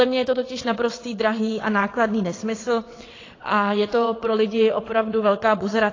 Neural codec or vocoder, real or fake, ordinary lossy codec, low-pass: vocoder, 22.05 kHz, 80 mel bands, WaveNeXt; fake; AAC, 32 kbps; 7.2 kHz